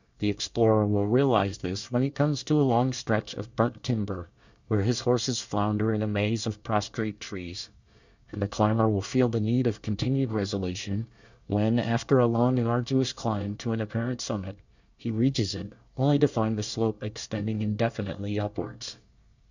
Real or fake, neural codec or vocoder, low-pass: fake; codec, 24 kHz, 1 kbps, SNAC; 7.2 kHz